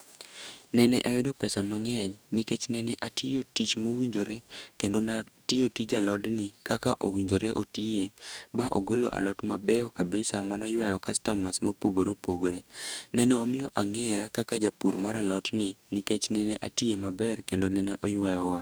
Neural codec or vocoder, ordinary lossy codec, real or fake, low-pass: codec, 44.1 kHz, 2.6 kbps, DAC; none; fake; none